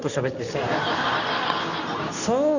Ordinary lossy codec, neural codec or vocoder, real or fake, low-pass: none; codec, 24 kHz, 0.9 kbps, WavTokenizer, medium speech release version 2; fake; 7.2 kHz